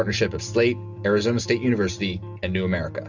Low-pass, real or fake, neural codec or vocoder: 7.2 kHz; real; none